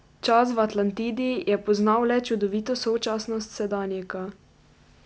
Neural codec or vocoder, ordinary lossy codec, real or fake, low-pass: none; none; real; none